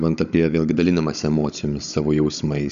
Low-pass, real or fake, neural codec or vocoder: 7.2 kHz; fake; codec, 16 kHz, 16 kbps, FunCodec, trained on Chinese and English, 50 frames a second